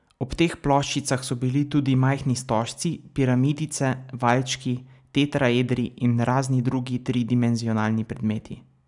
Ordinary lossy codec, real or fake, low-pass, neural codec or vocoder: none; real; 10.8 kHz; none